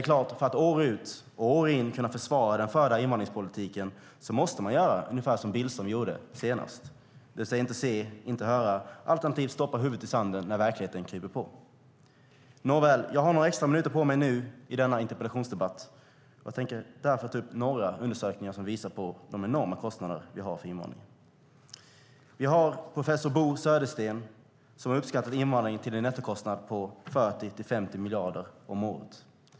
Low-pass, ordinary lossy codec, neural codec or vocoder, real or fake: none; none; none; real